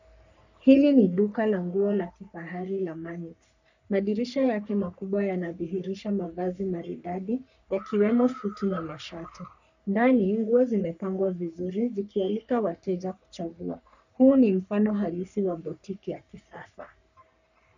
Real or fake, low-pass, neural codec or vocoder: fake; 7.2 kHz; codec, 44.1 kHz, 3.4 kbps, Pupu-Codec